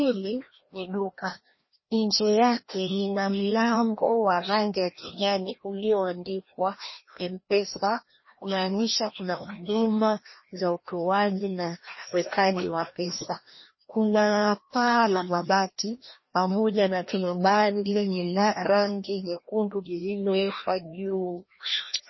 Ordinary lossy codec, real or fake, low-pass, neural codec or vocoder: MP3, 24 kbps; fake; 7.2 kHz; codec, 16 kHz, 1 kbps, FreqCodec, larger model